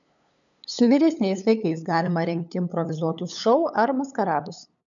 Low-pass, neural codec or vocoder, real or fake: 7.2 kHz; codec, 16 kHz, 8 kbps, FunCodec, trained on LibriTTS, 25 frames a second; fake